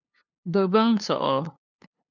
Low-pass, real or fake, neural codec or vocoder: 7.2 kHz; fake; codec, 16 kHz, 2 kbps, FunCodec, trained on LibriTTS, 25 frames a second